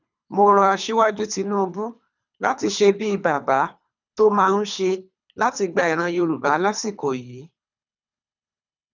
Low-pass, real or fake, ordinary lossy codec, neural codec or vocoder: 7.2 kHz; fake; none; codec, 24 kHz, 3 kbps, HILCodec